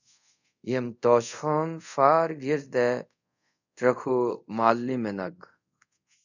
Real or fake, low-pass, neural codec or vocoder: fake; 7.2 kHz; codec, 24 kHz, 0.5 kbps, DualCodec